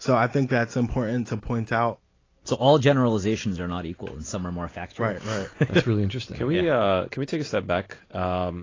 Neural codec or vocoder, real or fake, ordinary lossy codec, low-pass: none; real; AAC, 32 kbps; 7.2 kHz